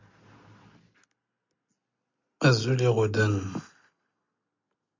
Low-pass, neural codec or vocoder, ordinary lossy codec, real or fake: 7.2 kHz; none; MP3, 64 kbps; real